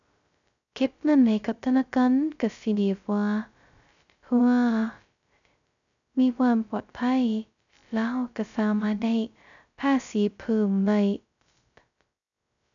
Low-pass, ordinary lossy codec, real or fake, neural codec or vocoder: 7.2 kHz; none; fake; codec, 16 kHz, 0.2 kbps, FocalCodec